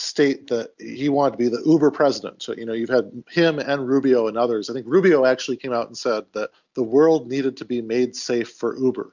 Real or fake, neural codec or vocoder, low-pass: real; none; 7.2 kHz